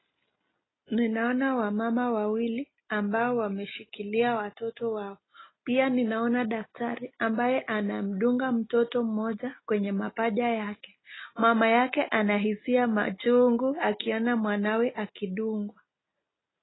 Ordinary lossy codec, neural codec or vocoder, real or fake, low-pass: AAC, 16 kbps; none; real; 7.2 kHz